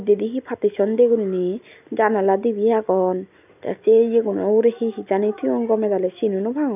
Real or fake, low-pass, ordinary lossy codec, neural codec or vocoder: real; 3.6 kHz; none; none